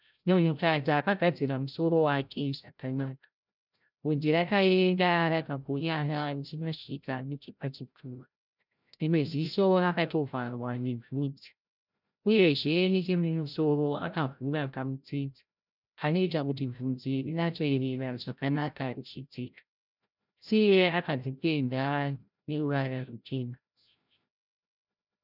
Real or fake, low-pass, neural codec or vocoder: fake; 5.4 kHz; codec, 16 kHz, 0.5 kbps, FreqCodec, larger model